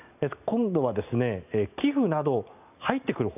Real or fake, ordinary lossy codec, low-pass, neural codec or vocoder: real; none; 3.6 kHz; none